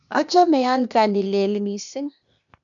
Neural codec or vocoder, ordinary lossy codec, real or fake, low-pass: codec, 16 kHz, 0.8 kbps, ZipCodec; none; fake; 7.2 kHz